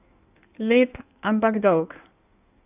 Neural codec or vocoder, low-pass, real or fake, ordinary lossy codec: codec, 16 kHz in and 24 kHz out, 1.1 kbps, FireRedTTS-2 codec; 3.6 kHz; fake; none